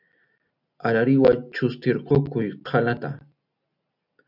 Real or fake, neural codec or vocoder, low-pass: real; none; 5.4 kHz